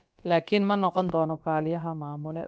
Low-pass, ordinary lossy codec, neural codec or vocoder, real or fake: none; none; codec, 16 kHz, about 1 kbps, DyCAST, with the encoder's durations; fake